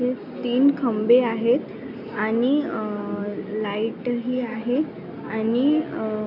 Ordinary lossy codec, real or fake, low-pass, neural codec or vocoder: none; real; 5.4 kHz; none